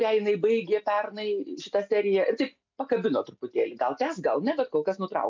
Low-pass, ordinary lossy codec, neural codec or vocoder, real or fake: 7.2 kHz; MP3, 64 kbps; none; real